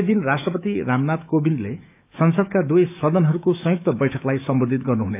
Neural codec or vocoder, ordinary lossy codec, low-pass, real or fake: autoencoder, 48 kHz, 128 numbers a frame, DAC-VAE, trained on Japanese speech; AAC, 32 kbps; 3.6 kHz; fake